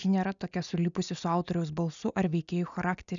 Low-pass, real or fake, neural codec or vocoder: 7.2 kHz; real; none